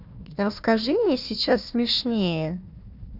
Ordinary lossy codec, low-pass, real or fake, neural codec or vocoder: none; 5.4 kHz; fake; codec, 16 kHz, 1 kbps, FunCodec, trained on Chinese and English, 50 frames a second